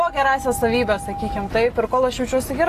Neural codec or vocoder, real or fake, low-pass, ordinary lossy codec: none; real; 14.4 kHz; AAC, 48 kbps